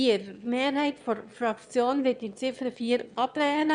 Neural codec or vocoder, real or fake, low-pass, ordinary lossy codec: autoencoder, 22.05 kHz, a latent of 192 numbers a frame, VITS, trained on one speaker; fake; 9.9 kHz; none